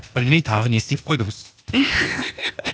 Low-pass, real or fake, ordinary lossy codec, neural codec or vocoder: none; fake; none; codec, 16 kHz, 0.8 kbps, ZipCodec